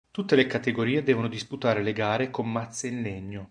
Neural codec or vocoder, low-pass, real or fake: none; 9.9 kHz; real